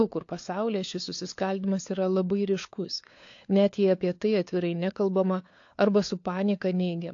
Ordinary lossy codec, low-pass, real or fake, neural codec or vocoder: AAC, 48 kbps; 7.2 kHz; fake; codec, 16 kHz, 4 kbps, FunCodec, trained on LibriTTS, 50 frames a second